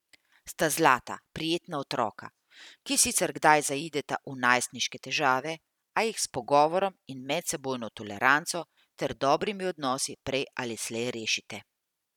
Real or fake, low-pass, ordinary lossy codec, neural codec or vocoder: fake; 19.8 kHz; none; vocoder, 44.1 kHz, 128 mel bands every 256 samples, BigVGAN v2